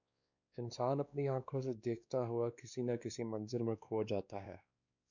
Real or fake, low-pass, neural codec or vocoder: fake; 7.2 kHz; codec, 16 kHz, 2 kbps, X-Codec, WavLM features, trained on Multilingual LibriSpeech